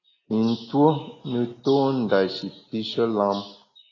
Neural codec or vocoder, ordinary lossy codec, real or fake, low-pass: none; AAC, 32 kbps; real; 7.2 kHz